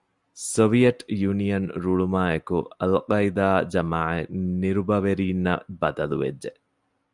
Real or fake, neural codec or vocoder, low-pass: real; none; 10.8 kHz